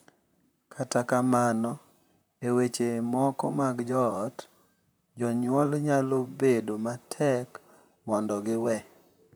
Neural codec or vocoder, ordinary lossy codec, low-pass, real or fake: vocoder, 44.1 kHz, 128 mel bands, Pupu-Vocoder; none; none; fake